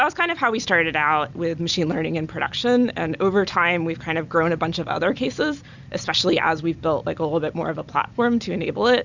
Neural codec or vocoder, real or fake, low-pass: none; real; 7.2 kHz